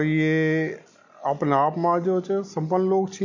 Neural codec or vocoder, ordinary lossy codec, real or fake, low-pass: none; none; real; 7.2 kHz